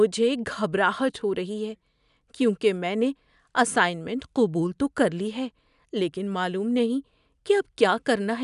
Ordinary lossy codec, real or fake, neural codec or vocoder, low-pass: none; real; none; 10.8 kHz